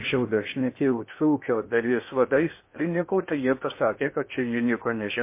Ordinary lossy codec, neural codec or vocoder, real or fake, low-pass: MP3, 24 kbps; codec, 16 kHz in and 24 kHz out, 0.6 kbps, FocalCodec, streaming, 2048 codes; fake; 3.6 kHz